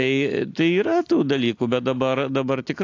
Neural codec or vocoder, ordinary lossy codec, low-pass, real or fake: none; AAC, 48 kbps; 7.2 kHz; real